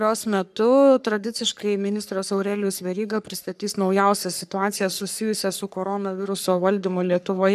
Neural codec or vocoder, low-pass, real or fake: codec, 44.1 kHz, 3.4 kbps, Pupu-Codec; 14.4 kHz; fake